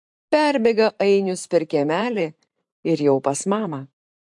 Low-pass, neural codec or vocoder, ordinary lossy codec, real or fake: 10.8 kHz; vocoder, 44.1 kHz, 128 mel bands every 512 samples, BigVGAN v2; MP3, 64 kbps; fake